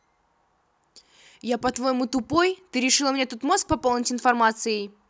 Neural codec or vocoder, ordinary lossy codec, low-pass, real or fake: none; none; none; real